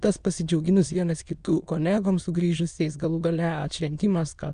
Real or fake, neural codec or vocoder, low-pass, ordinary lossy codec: fake; autoencoder, 22.05 kHz, a latent of 192 numbers a frame, VITS, trained on many speakers; 9.9 kHz; Opus, 64 kbps